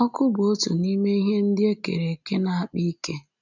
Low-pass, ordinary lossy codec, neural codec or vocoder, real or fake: 7.2 kHz; none; none; real